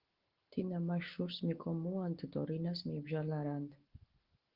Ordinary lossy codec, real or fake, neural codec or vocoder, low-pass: Opus, 16 kbps; real; none; 5.4 kHz